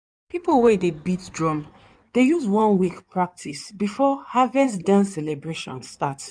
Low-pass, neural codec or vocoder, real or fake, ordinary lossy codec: 9.9 kHz; codec, 16 kHz in and 24 kHz out, 2.2 kbps, FireRedTTS-2 codec; fake; none